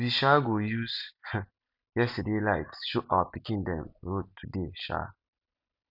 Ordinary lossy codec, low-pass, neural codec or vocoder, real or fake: none; 5.4 kHz; none; real